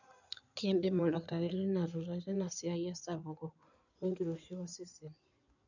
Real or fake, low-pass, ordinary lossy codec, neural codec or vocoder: fake; 7.2 kHz; none; codec, 16 kHz in and 24 kHz out, 2.2 kbps, FireRedTTS-2 codec